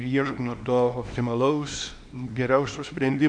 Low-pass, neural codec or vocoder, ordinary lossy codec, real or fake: 9.9 kHz; codec, 24 kHz, 0.9 kbps, WavTokenizer, small release; AAC, 64 kbps; fake